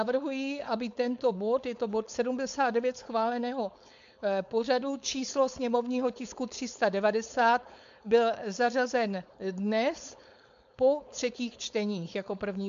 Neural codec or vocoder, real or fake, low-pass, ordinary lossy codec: codec, 16 kHz, 4.8 kbps, FACodec; fake; 7.2 kHz; MP3, 64 kbps